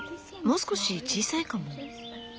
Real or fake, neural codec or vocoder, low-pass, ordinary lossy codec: real; none; none; none